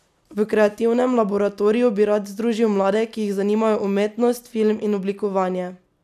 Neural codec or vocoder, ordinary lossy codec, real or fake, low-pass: none; none; real; 14.4 kHz